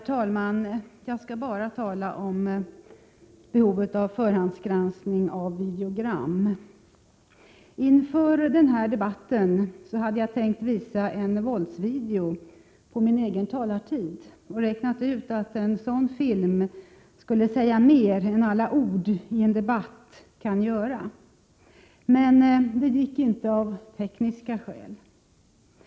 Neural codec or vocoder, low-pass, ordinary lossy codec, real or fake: none; none; none; real